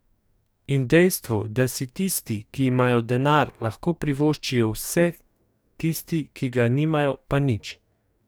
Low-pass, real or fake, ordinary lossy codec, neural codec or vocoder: none; fake; none; codec, 44.1 kHz, 2.6 kbps, DAC